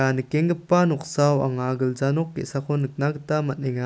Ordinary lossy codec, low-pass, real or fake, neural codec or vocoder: none; none; real; none